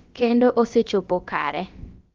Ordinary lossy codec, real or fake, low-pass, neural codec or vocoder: Opus, 32 kbps; fake; 7.2 kHz; codec, 16 kHz, about 1 kbps, DyCAST, with the encoder's durations